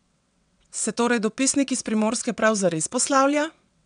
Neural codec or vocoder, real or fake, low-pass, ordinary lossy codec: vocoder, 22.05 kHz, 80 mel bands, WaveNeXt; fake; 9.9 kHz; none